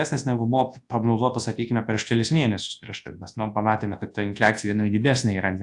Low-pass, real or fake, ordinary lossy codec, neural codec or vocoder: 10.8 kHz; fake; AAC, 64 kbps; codec, 24 kHz, 0.9 kbps, WavTokenizer, large speech release